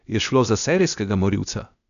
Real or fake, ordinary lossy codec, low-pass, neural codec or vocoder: fake; none; 7.2 kHz; codec, 16 kHz, 0.8 kbps, ZipCodec